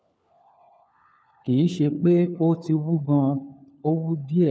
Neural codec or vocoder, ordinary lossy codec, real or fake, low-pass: codec, 16 kHz, 4 kbps, FunCodec, trained on LibriTTS, 50 frames a second; none; fake; none